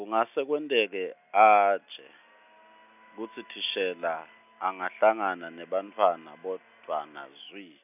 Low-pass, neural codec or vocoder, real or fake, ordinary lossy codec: 3.6 kHz; none; real; none